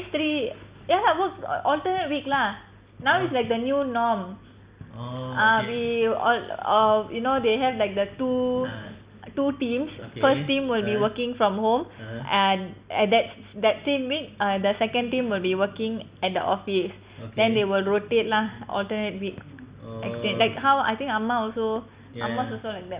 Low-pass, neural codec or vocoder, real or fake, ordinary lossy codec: 3.6 kHz; none; real; Opus, 64 kbps